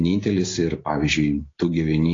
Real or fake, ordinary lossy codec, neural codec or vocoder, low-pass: real; AAC, 32 kbps; none; 7.2 kHz